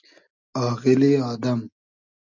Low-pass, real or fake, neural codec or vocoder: 7.2 kHz; real; none